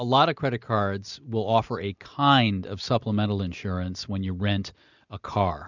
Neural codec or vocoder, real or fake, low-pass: none; real; 7.2 kHz